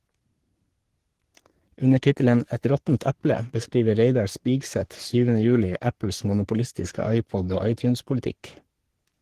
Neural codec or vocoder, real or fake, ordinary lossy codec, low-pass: codec, 44.1 kHz, 3.4 kbps, Pupu-Codec; fake; Opus, 16 kbps; 14.4 kHz